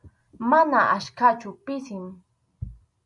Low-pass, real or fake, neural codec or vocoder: 10.8 kHz; real; none